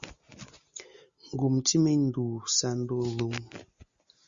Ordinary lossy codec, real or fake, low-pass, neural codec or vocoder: Opus, 64 kbps; real; 7.2 kHz; none